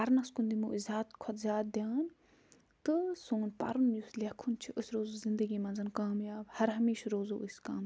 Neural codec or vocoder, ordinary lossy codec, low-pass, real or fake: none; none; none; real